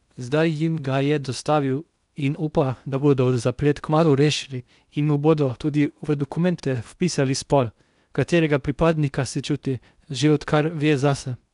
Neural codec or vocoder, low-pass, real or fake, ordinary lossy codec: codec, 16 kHz in and 24 kHz out, 0.8 kbps, FocalCodec, streaming, 65536 codes; 10.8 kHz; fake; none